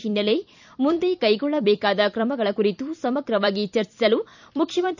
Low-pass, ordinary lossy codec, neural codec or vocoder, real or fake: 7.2 kHz; none; vocoder, 44.1 kHz, 128 mel bands every 256 samples, BigVGAN v2; fake